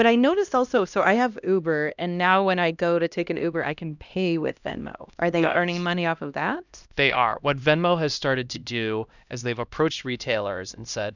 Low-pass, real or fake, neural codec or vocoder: 7.2 kHz; fake; codec, 16 kHz, 1 kbps, X-Codec, HuBERT features, trained on LibriSpeech